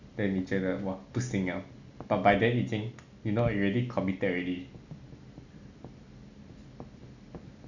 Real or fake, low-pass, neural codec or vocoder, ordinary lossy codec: real; 7.2 kHz; none; none